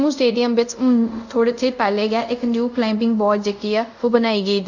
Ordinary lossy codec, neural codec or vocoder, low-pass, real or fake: none; codec, 24 kHz, 0.5 kbps, DualCodec; 7.2 kHz; fake